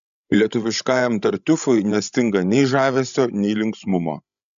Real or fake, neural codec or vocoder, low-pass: fake; codec, 16 kHz, 16 kbps, FreqCodec, larger model; 7.2 kHz